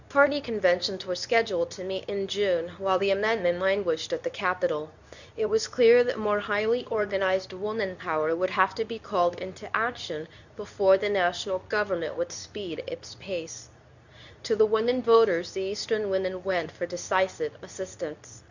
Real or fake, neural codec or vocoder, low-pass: fake; codec, 24 kHz, 0.9 kbps, WavTokenizer, medium speech release version 2; 7.2 kHz